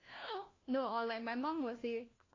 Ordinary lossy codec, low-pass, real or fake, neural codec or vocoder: AAC, 32 kbps; 7.2 kHz; fake; codec, 16 kHz, 1 kbps, FunCodec, trained on LibriTTS, 50 frames a second